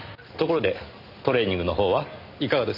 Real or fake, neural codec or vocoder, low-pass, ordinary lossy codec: real; none; 5.4 kHz; none